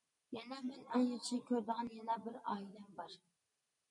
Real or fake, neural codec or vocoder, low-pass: real; none; 10.8 kHz